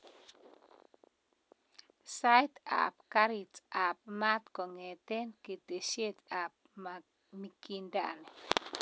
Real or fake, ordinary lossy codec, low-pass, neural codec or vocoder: real; none; none; none